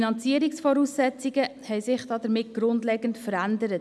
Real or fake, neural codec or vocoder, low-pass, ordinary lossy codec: real; none; none; none